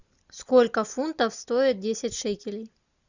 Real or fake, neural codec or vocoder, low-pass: real; none; 7.2 kHz